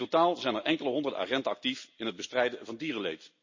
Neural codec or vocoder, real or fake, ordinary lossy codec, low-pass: none; real; MP3, 32 kbps; 7.2 kHz